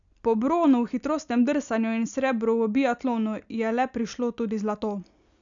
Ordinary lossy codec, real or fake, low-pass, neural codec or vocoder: none; real; 7.2 kHz; none